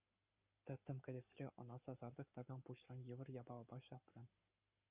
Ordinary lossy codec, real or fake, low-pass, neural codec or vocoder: Opus, 64 kbps; fake; 3.6 kHz; codec, 44.1 kHz, 7.8 kbps, Pupu-Codec